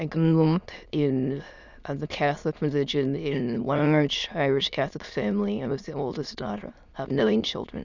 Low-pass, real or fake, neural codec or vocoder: 7.2 kHz; fake; autoencoder, 22.05 kHz, a latent of 192 numbers a frame, VITS, trained on many speakers